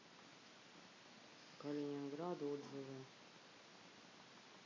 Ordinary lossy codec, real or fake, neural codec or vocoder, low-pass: MP3, 48 kbps; real; none; 7.2 kHz